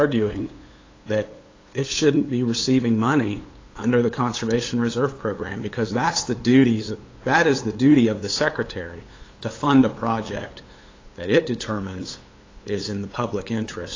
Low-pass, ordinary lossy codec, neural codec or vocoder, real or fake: 7.2 kHz; AAC, 32 kbps; codec, 16 kHz, 8 kbps, FunCodec, trained on LibriTTS, 25 frames a second; fake